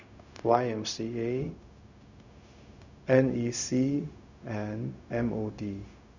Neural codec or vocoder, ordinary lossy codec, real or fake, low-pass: codec, 16 kHz, 0.4 kbps, LongCat-Audio-Codec; none; fake; 7.2 kHz